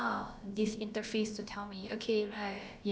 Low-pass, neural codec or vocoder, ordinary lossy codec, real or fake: none; codec, 16 kHz, about 1 kbps, DyCAST, with the encoder's durations; none; fake